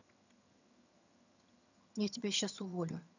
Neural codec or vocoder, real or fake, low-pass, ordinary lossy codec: vocoder, 22.05 kHz, 80 mel bands, HiFi-GAN; fake; 7.2 kHz; none